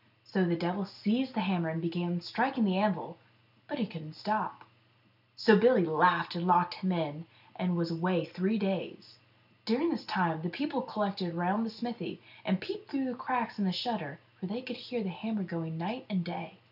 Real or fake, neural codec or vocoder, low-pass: real; none; 5.4 kHz